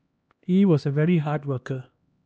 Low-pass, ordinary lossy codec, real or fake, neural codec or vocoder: none; none; fake; codec, 16 kHz, 1 kbps, X-Codec, HuBERT features, trained on LibriSpeech